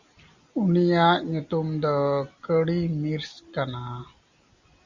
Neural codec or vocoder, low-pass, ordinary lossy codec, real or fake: none; 7.2 kHz; Opus, 64 kbps; real